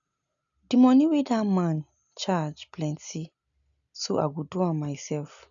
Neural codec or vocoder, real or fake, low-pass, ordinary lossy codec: none; real; 7.2 kHz; none